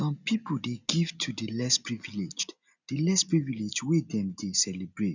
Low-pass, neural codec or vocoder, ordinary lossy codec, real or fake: 7.2 kHz; none; none; real